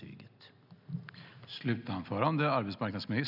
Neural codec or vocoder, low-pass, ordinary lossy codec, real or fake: none; 5.4 kHz; none; real